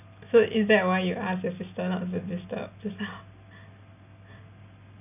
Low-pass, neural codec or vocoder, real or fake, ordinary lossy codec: 3.6 kHz; none; real; none